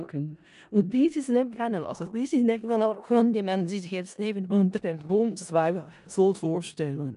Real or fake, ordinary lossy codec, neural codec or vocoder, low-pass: fake; none; codec, 16 kHz in and 24 kHz out, 0.4 kbps, LongCat-Audio-Codec, four codebook decoder; 10.8 kHz